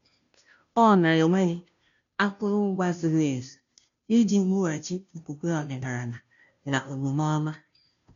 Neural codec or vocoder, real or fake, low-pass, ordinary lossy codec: codec, 16 kHz, 0.5 kbps, FunCodec, trained on Chinese and English, 25 frames a second; fake; 7.2 kHz; none